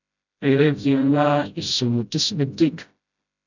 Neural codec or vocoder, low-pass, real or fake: codec, 16 kHz, 0.5 kbps, FreqCodec, smaller model; 7.2 kHz; fake